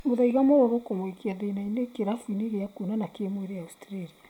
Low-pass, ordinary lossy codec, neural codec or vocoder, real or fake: 19.8 kHz; none; none; real